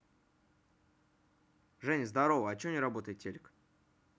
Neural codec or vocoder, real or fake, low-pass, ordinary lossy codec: none; real; none; none